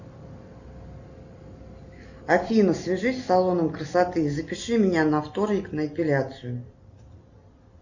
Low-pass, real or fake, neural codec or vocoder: 7.2 kHz; real; none